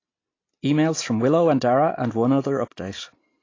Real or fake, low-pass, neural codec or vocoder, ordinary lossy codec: real; 7.2 kHz; none; AAC, 32 kbps